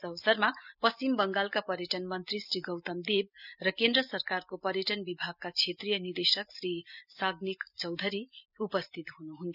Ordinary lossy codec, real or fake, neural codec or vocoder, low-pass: none; real; none; 5.4 kHz